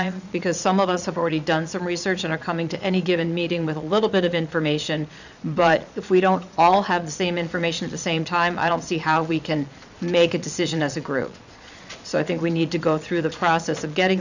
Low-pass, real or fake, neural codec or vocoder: 7.2 kHz; fake; vocoder, 22.05 kHz, 80 mel bands, Vocos